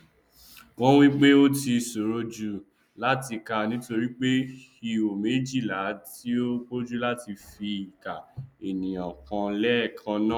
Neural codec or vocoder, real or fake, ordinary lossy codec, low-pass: none; real; none; 19.8 kHz